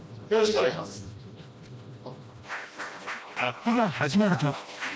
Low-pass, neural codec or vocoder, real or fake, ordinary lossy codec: none; codec, 16 kHz, 1 kbps, FreqCodec, smaller model; fake; none